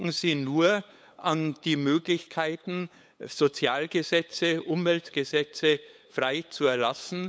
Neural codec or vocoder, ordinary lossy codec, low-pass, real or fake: codec, 16 kHz, 8 kbps, FunCodec, trained on LibriTTS, 25 frames a second; none; none; fake